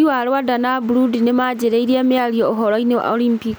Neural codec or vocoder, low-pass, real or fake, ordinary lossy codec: none; none; real; none